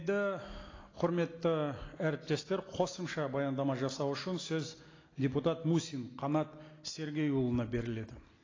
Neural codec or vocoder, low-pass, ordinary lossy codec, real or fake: none; 7.2 kHz; AAC, 32 kbps; real